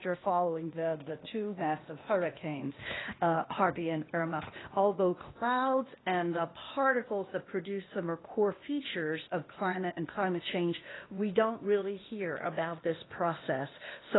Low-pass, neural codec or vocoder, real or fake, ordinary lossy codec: 7.2 kHz; codec, 16 kHz, 0.8 kbps, ZipCodec; fake; AAC, 16 kbps